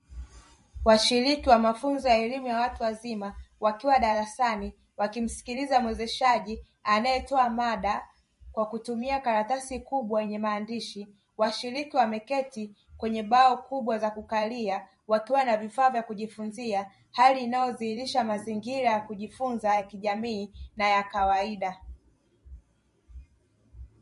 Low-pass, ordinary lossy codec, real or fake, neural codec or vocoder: 14.4 kHz; MP3, 48 kbps; fake; vocoder, 44.1 kHz, 128 mel bands every 512 samples, BigVGAN v2